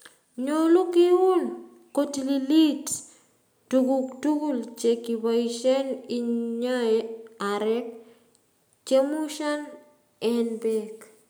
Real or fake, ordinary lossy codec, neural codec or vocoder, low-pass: real; none; none; none